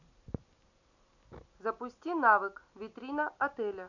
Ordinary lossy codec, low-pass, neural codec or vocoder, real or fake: none; 7.2 kHz; none; real